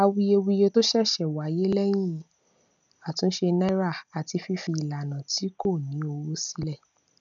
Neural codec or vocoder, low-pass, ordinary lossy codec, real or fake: none; 7.2 kHz; none; real